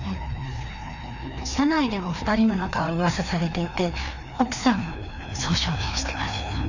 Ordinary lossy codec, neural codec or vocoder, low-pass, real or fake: none; codec, 16 kHz, 2 kbps, FreqCodec, larger model; 7.2 kHz; fake